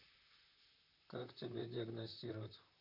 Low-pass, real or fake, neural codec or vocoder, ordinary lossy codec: 5.4 kHz; fake; vocoder, 44.1 kHz, 128 mel bands, Pupu-Vocoder; none